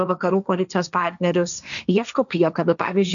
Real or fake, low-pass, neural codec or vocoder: fake; 7.2 kHz; codec, 16 kHz, 1.1 kbps, Voila-Tokenizer